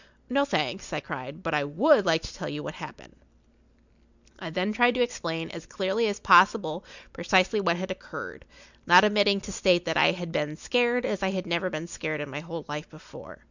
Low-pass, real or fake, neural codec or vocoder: 7.2 kHz; real; none